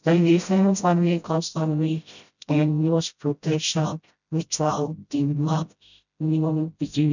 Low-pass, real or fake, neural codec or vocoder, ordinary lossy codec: 7.2 kHz; fake; codec, 16 kHz, 0.5 kbps, FreqCodec, smaller model; none